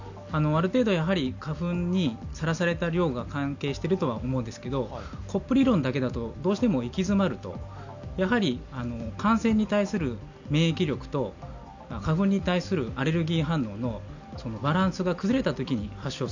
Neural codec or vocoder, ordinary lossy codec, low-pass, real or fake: none; none; 7.2 kHz; real